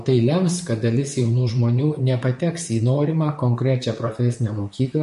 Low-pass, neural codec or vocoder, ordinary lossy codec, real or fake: 14.4 kHz; vocoder, 44.1 kHz, 128 mel bands, Pupu-Vocoder; MP3, 48 kbps; fake